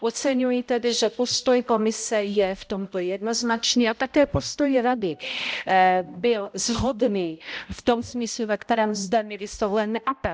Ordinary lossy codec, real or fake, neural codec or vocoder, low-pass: none; fake; codec, 16 kHz, 0.5 kbps, X-Codec, HuBERT features, trained on balanced general audio; none